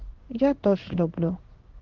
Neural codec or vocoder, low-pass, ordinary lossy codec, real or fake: codec, 16 kHz in and 24 kHz out, 1 kbps, XY-Tokenizer; 7.2 kHz; Opus, 16 kbps; fake